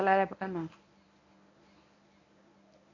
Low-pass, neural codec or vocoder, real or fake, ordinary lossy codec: 7.2 kHz; codec, 24 kHz, 0.9 kbps, WavTokenizer, medium speech release version 1; fake; none